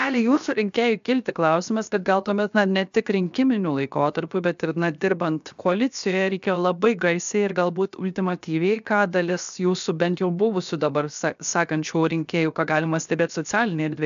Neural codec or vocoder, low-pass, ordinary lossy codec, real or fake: codec, 16 kHz, 0.7 kbps, FocalCodec; 7.2 kHz; AAC, 96 kbps; fake